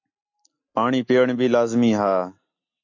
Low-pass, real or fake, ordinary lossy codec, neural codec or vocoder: 7.2 kHz; real; AAC, 48 kbps; none